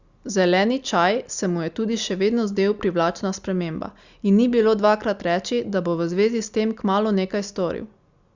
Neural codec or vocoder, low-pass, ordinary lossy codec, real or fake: none; 7.2 kHz; Opus, 64 kbps; real